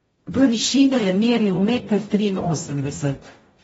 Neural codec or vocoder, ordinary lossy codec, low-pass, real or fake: codec, 44.1 kHz, 0.9 kbps, DAC; AAC, 24 kbps; 19.8 kHz; fake